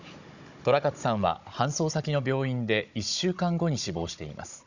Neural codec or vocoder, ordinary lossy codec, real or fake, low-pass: codec, 16 kHz, 16 kbps, FunCodec, trained on Chinese and English, 50 frames a second; none; fake; 7.2 kHz